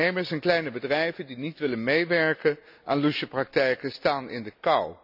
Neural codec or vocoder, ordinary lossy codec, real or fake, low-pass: none; none; real; 5.4 kHz